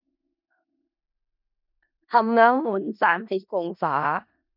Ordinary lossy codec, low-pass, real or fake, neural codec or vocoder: none; 5.4 kHz; fake; codec, 16 kHz in and 24 kHz out, 0.4 kbps, LongCat-Audio-Codec, four codebook decoder